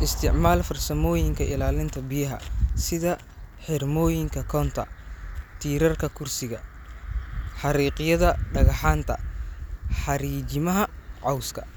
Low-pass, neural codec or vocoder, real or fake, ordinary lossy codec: none; none; real; none